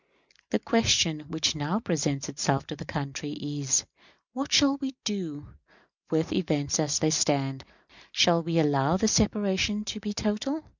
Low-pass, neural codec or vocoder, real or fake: 7.2 kHz; none; real